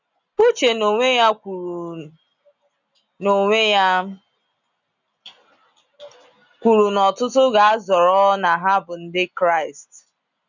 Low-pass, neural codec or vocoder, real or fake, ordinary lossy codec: 7.2 kHz; none; real; none